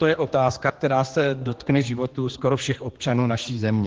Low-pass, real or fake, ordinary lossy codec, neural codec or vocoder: 7.2 kHz; fake; Opus, 16 kbps; codec, 16 kHz, 2 kbps, X-Codec, HuBERT features, trained on general audio